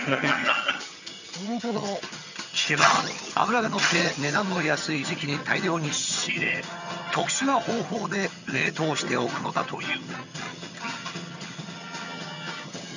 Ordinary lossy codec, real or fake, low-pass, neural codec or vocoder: none; fake; 7.2 kHz; vocoder, 22.05 kHz, 80 mel bands, HiFi-GAN